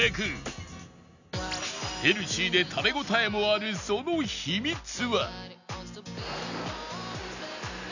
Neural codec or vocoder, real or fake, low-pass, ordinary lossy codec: none; real; 7.2 kHz; none